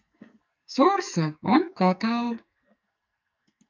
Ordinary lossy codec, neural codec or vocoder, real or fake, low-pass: MP3, 64 kbps; codec, 44.1 kHz, 2.6 kbps, SNAC; fake; 7.2 kHz